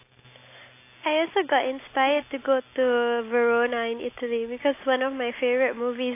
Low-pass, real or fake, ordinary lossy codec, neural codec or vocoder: 3.6 kHz; real; AAC, 24 kbps; none